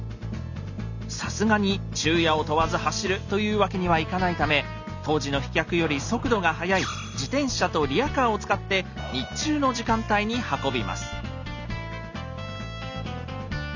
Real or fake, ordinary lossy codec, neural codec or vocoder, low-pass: real; none; none; 7.2 kHz